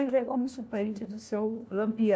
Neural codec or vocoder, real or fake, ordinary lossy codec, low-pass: codec, 16 kHz, 1 kbps, FunCodec, trained on LibriTTS, 50 frames a second; fake; none; none